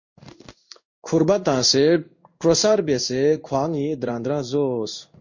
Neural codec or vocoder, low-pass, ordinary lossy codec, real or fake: codec, 16 kHz in and 24 kHz out, 1 kbps, XY-Tokenizer; 7.2 kHz; MP3, 48 kbps; fake